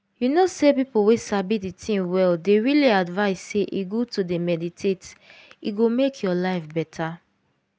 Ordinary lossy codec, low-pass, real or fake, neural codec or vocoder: none; none; real; none